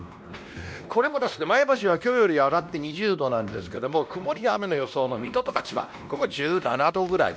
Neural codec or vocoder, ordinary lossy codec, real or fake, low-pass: codec, 16 kHz, 1 kbps, X-Codec, WavLM features, trained on Multilingual LibriSpeech; none; fake; none